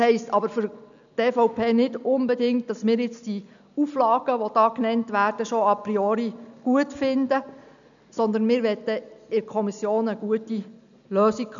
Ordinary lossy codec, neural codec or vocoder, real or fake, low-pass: none; none; real; 7.2 kHz